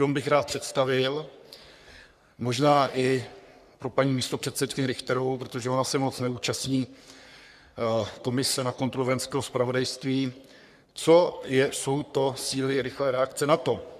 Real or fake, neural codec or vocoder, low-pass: fake; codec, 44.1 kHz, 3.4 kbps, Pupu-Codec; 14.4 kHz